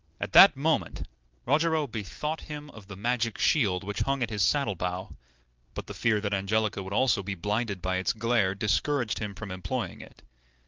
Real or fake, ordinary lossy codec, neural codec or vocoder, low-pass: real; Opus, 32 kbps; none; 7.2 kHz